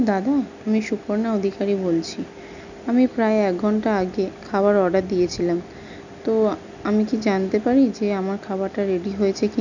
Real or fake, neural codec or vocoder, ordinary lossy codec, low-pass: real; none; none; 7.2 kHz